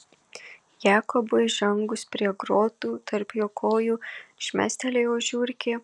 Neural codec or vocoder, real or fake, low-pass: none; real; 10.8 kHz